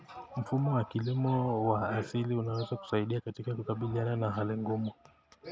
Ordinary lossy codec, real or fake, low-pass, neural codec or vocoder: none; real; none; none